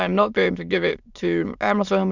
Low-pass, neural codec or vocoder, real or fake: 7.2 kHz; autoencoder, 22.05 kHz, a latent of 192 numbers a frame, VITS, trained on many speakers; fake